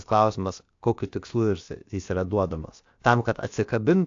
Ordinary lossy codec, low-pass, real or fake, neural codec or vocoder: AAC, 48 kbps; 7.2 kHz; fake; codec, 16 kHz, about 1 kbps, DyCAST, with the encoder's durations